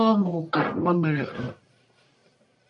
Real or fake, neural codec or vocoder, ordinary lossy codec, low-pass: fake; codec, 44.1 kHz, 1.7 kbps, Pupu-Codec; MP3, 48 kbps; 10.8 kHz